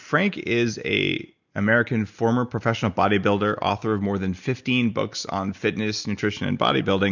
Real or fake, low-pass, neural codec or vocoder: real; 7.2 kHz; none